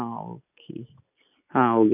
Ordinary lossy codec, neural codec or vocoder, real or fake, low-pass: none; none; real; 3.6 kHz